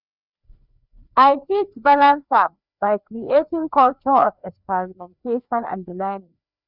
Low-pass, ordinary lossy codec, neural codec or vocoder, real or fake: 5.4 kHz; none; codec, 16 kHz, 2 kbps, FreqCodec, larger model; fake